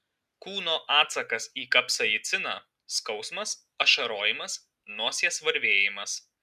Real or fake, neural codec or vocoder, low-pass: real; none; 14.4 kHz